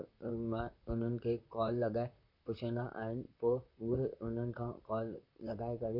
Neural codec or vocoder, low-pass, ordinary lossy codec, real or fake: vocoder, 44.1 kHz, 128 mel bands, Pupu-Vocoder; 5.4 kHz; none; fake